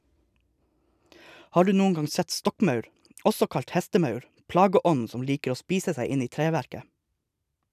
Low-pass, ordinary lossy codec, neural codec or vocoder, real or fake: 14.4 kHz; none; none; real